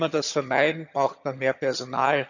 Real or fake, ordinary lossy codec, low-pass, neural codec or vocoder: fake; none; 7.2 kHz; vocoder, 22.05 kHz, 80 mel bands, HiFi-GAN